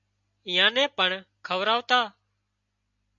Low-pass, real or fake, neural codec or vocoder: 7.2 kHz; real; none